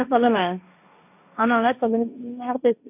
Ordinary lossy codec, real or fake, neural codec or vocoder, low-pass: none; fake; codec, 16 kHz, 1.1 kbps, Voila-Tokenizer; 3.6 kHz